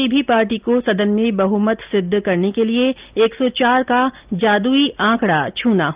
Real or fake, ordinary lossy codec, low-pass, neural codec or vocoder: real; Opus, 24 kbps; 3.6 kHz; none